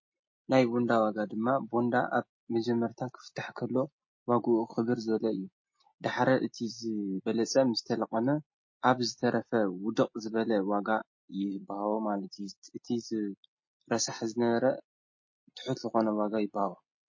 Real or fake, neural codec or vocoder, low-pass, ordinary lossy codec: real; none; 7.2 kHz; MP3, 32 kbps